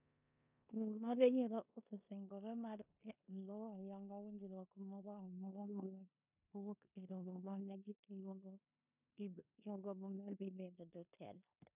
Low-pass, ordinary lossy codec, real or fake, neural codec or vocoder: 3.6 kHz; none; fake; codec, 16 kHz in and 24 kHz out, 0.9 kbps, LongCat-Audio-Codec, four codebook decoder